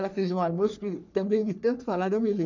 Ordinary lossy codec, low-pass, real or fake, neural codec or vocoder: none; 7.2 kHz; fake; codec, 44.1 kHz, 3.4 kbps, Pupu-Codec